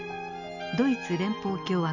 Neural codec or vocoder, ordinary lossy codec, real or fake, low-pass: none; none; real; 7.2 kHz